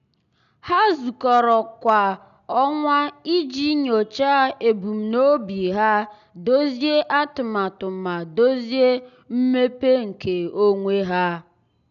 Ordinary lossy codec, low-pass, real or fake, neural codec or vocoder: none; 7.2 kHz; real; none